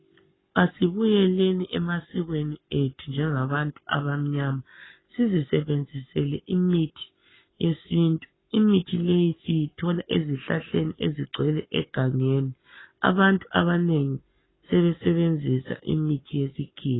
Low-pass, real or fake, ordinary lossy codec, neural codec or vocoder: 7.2 kHz; fake; AAC, 16 kbps; codec, 44.1 kHz, 7.8 kbps, Pupu-Codec